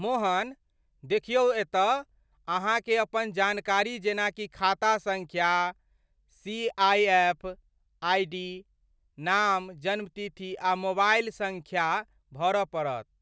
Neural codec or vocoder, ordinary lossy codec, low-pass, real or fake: none; none; none; real